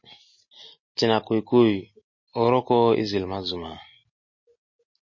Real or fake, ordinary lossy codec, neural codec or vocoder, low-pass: real; MP3, 32 kbps; none; 7.2 kHz